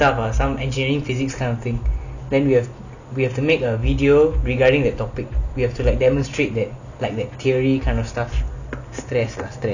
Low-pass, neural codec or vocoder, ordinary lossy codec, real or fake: 7.2 kHz; none; none; real